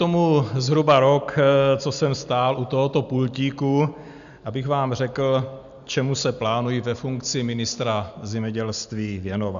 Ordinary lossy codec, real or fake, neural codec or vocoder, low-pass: MP3, 96 kbps; real; none; 7.2 kHz